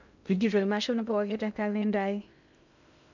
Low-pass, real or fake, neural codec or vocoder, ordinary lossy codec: 7.2 kHz; fake; codec, 16 kHz in and 24 kHz out, 0.6 kbps, FocalCodec, streaming, 2048 codes; none